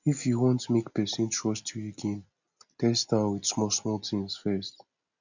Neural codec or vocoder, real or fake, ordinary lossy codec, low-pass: none; real; none; 7.2 kHz